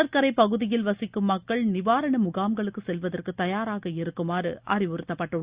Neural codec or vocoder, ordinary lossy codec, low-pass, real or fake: none; Opus, 64 kbps; 3.6 kHz; real